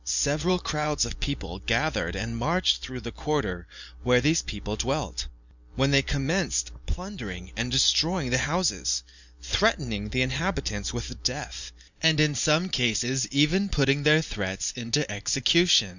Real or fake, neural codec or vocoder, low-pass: fake; vocoder, 44.1 kHz, 128 mel bands every 512 samples, BigVGAN v2; 7.2 kHz